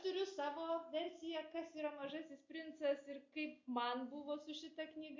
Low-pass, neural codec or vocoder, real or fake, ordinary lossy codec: 7.2 kHz; none; real; MP3, 48 kbps